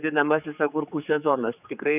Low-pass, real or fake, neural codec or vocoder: 3.6 kHz; fake; codec, 16 kHz, 4 kbps, X-Codec, HuBERT features, trained on general audio